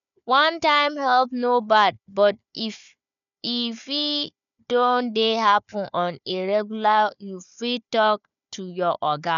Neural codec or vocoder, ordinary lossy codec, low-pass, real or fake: codec, 16 kHz, 4 kbps, FunCodec, trained on Chinese and English, 50 frames a second; none; 7.2 kHz; fake